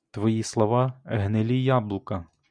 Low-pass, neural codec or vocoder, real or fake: 9.9 kHz; none; real